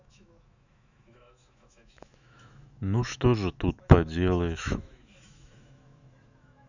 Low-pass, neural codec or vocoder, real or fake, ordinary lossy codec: 7.2 kHz; autoencoder, 48 kHz, 128 numbers a frame, DAC-VAE, trained on Japanese speech; fake; none